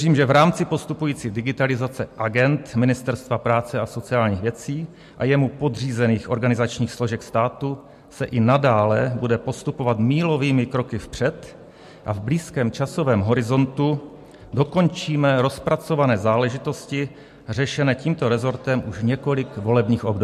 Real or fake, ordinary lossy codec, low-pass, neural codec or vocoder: real; MP3, 64 kbps; 14.4 kHz; none